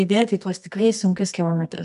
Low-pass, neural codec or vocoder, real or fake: 10.8 kHz; codec, 24 kHz, 0.9 kbps, WavTokenizer, medium music audio release; fake